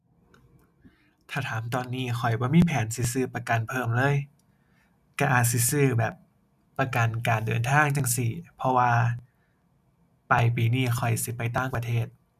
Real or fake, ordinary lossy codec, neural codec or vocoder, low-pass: real; none; none; 14.4 kHz